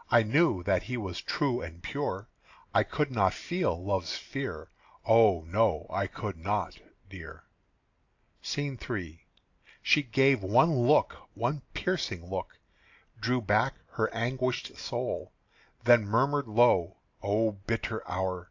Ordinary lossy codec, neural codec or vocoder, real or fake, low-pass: AAC, 48 kbps; none; real; 7.2 kHz